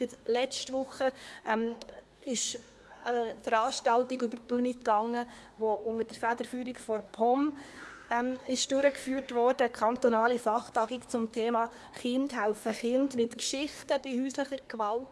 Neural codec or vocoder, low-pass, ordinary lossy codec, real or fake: codec, 24 kHz, 1 kbps, SNAC; none; none; fake